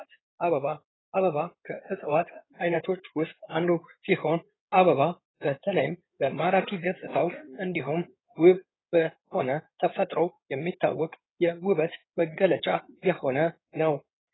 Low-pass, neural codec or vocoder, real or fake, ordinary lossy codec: 7.2 kHz; codec, 16 kHz in and 24 kHz out, 2.2 kbps, FireRedTTS-2 codec; fake; AAC, 16 kbps